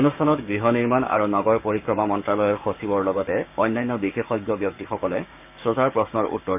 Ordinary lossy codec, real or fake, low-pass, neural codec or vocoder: none; fake; 3.6 kHz; codec, 16 kHz, 6 kbps, DAC